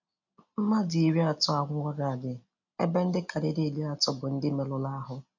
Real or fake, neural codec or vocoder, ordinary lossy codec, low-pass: real; none; none; 7.2 kHz